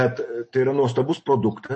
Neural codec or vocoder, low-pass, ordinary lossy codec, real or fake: none; 10.8 kHz; MP3, 32 kbps; real